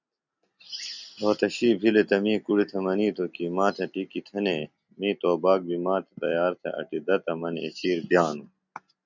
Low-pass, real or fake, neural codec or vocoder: 7.2 kHz; real; none